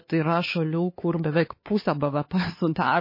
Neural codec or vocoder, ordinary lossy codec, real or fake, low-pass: codec, 16 kHz, 4 kbps, X-Codec, HuBERT features, trained on LibriSpeech; MP3, 24 kbps; fake; 5.4 kHz